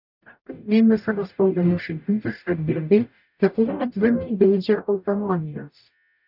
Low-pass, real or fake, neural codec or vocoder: 5.4 kHz; fake; codec, 44.1 kHz, 0.9 kbps, DAC